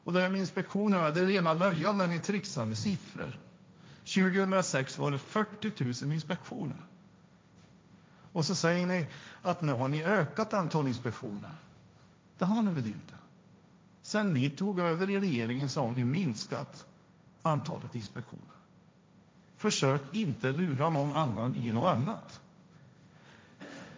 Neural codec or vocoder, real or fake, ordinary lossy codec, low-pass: codec, 16 kHz, 1.1 kbps, Voila-Tokenizer; fake; none; none